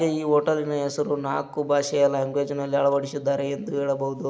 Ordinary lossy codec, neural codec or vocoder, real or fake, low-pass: none; none; real; none